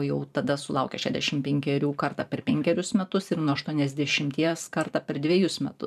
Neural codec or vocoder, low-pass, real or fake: vocoder, 44.1 kHz, 128 mel bands every 512 samples, BigVGAN v2; 14.4 kHz; fake